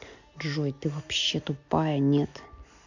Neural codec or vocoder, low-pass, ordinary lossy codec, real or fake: none; 7.2 kHz; none; real